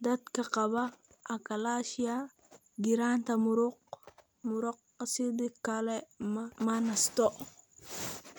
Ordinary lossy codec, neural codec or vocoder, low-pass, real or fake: none; none; none; real